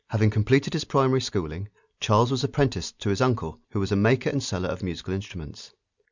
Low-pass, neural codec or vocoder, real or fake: 7.2 kHz; none; real